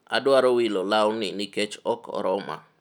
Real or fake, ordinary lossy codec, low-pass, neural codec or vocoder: real; none; 19.8 kHz; none